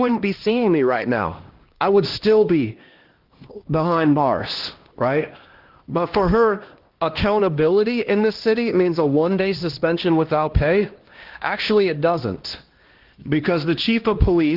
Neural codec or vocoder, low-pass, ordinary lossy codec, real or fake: codec, 16 kHz, 1 kbps, X-Codec, HuBERT features, trained on LibriSpeech; 5.4 kHz; Opus, 16 kbps; fake